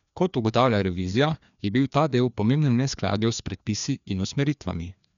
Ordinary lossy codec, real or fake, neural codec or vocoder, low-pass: none; fake; codec, 16 kHz, 2 kbps, FreqCodec, larger model; 7.2 kHz